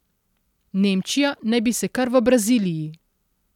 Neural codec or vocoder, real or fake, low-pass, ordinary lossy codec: none; real; 19.8 kHz; none